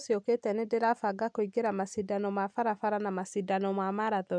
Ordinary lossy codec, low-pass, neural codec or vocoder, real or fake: none; 9.9 kHz; none; real